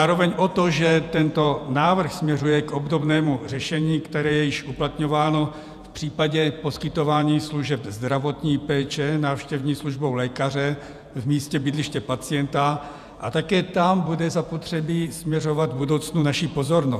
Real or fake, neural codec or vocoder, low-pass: fake; vocoder, 48 kHz, 128 mel bands, Vocos; 14.4 kHz